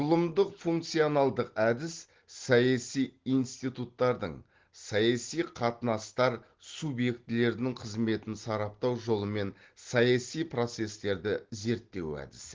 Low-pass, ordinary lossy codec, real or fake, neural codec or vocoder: 7.2 kHz; Opus, 16 kbps; real; none